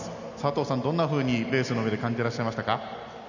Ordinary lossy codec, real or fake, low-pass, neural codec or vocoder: none; real; 7.2 kHz; none